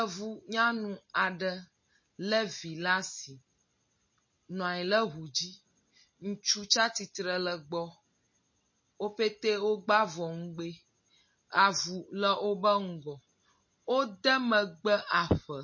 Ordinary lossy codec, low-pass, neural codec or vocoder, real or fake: MP3, 32 kbps; 7.2 kHz; none; real